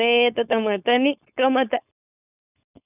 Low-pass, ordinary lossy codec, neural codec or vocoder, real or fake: 3.6 kHz; none; codec, 16 kHz, 4.8 kbps, FACodec; fake